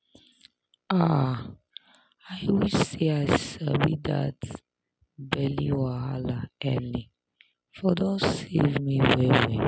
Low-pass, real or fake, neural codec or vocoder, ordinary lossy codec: none; real; none; none